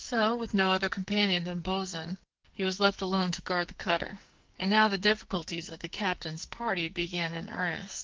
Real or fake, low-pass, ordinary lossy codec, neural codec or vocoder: fake; 7.2 kHz; Opus, 32 kbps; codec, 44.1 kHz, 2.6 kbps, SNAC